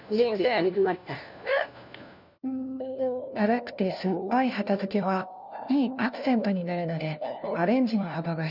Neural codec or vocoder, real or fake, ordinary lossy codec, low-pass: codec, 16 kHz, 1 kbps, FunCodec, trained on LibriTTS, 50 frames a second; fake; none; 5.4 kHz